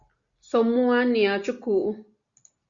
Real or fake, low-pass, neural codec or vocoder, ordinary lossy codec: real; 7.2 kHz; none; Opus, 64 kbps